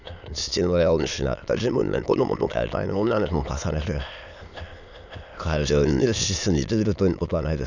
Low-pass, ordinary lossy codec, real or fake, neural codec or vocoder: 7.2 kHz; none; fake; autoencoder, 22.05 kHz, a latent of 192 numbers a frame, VITS, trained on many speakers